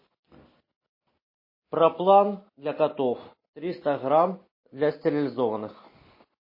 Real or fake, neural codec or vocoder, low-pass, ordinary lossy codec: real; none; 5.4 kHz; MP3, 24 kbps